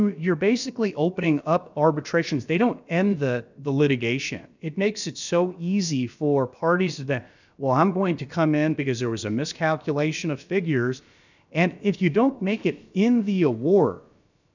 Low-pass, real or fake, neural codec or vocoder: 7.2 kHz; fake; codec, 16 kHz, about 1 kbps, DyCAST, with the encoder's durations